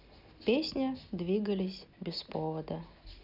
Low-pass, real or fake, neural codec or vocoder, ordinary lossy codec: 5.4 kHz; real; none; none